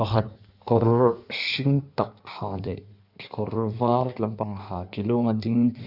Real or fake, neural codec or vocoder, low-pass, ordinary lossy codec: fake; codec, 16 kHz in and 24 kHz out, 1.1 kbps, FireRedTTS-2 codec; 5.4 kHz; none